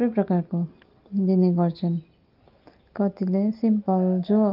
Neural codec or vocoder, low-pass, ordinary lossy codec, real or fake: none; 5.4 kHz; Opus, 24 kbps; real